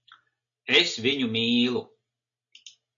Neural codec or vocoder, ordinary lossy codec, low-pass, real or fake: none; AAC, 48 kbps; 7.2 kHz; real